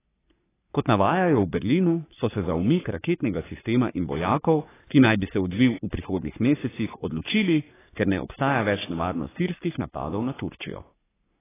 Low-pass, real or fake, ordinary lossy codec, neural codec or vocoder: 3.6 kHz; fake; AAC, 16 kbps; codec, 44.1 kHz, 3.4 kbps, Pupu-Codec